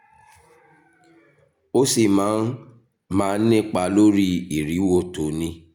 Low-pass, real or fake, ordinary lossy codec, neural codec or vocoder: 19.8 kHz; real; none; none